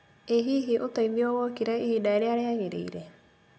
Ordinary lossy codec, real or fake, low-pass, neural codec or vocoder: none; real; none; none